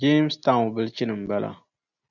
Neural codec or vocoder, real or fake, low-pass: none; real; 7.2 kHz